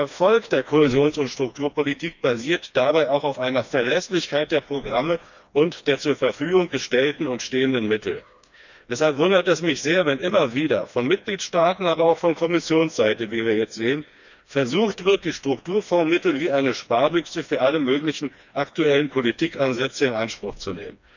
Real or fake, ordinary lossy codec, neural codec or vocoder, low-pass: fake; none; codec, 16 kHz, 2 kbps, FreqCodec, smaller model; 7.2 kHz